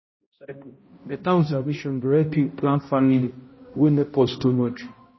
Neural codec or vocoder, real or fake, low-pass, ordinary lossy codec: codec, 16 kHz, 0.5 kbps, X-Codec, HuBERT features, trained on balanced general audio; fake; 7.2 kHz; MP3, 24 kbps